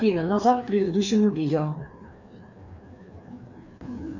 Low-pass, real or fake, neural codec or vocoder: 7.2 kHz; fake; codec, 16 kHz, 2 kbps, FreqCodec, larger model